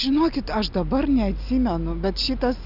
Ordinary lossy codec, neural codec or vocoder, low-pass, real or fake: AAC, 48 kbps; none; 5.4 kHz; real